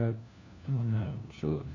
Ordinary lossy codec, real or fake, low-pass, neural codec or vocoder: none; fake; 7.2 kHz; codec, 16 kHz, 1 kbps, FunCodec, trained on LibriTTS, 50 frames a second